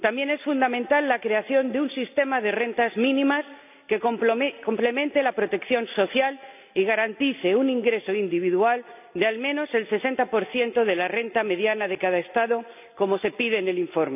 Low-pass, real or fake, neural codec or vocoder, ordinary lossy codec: 3.6 kHz; real; none; none